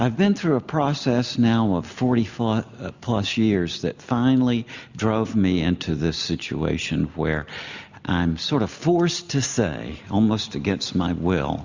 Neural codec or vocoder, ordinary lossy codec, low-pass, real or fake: none; Opus, 64 kbps; 7.2 kHz; real